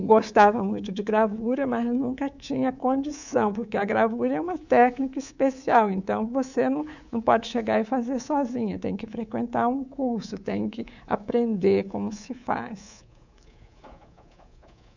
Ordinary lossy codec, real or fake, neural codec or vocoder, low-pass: none; fake; codec, 24 kHz, 3.1 kbps, DualCodec; 7.2 kHz